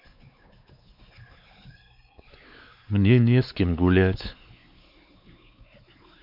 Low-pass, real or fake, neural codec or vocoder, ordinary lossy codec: 5.4 kHz; fake; codec, 16 kHz, 4 kbps, X-Codec, WavLM features, trained on Multilingual LibriSpeech; none